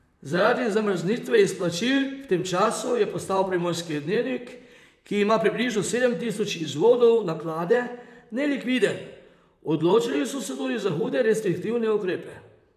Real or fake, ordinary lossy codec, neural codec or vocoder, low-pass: fake; none; vocoder, 44.1 kHz, 128 mel bands, Pupu-Vocoder; 14.4 kHz